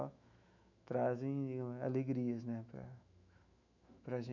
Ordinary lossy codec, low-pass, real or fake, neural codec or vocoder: none; 7.2 kHz; fake; autoencoder, 48 kHz, 128 numbers a frame, DAC-VAE, trained on Japanese speech